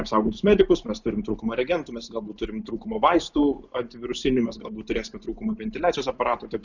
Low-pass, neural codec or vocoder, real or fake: 7.2 kHz; none; real